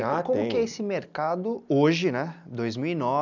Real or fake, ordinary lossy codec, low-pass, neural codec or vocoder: real; none; 7.2 kHz; none